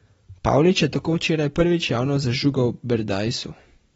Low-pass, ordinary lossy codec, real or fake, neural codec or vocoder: 10.8 kHz; AAC, 24 kbps; real; none